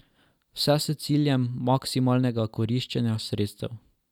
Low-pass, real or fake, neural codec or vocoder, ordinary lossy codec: 19.8 kHz; real; none; none